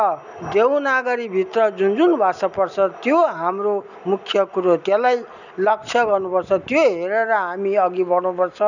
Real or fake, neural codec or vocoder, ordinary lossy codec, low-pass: real; none; none; 7.2 kHz